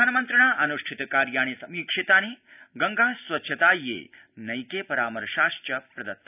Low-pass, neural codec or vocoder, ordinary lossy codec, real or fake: 3.6 kHz; none; none; real